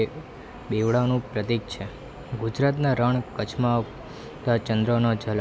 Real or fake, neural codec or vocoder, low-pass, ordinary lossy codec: real; none; none; none